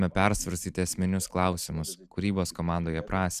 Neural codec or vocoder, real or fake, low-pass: none; real; 14.4 kHz